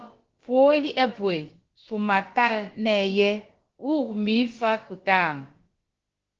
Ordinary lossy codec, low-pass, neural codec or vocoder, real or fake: Opus, 16 kbps; 7.2 kHz; codec, 16 kHz, about 1 kbps, DyCAST, with the encoder's durations; fake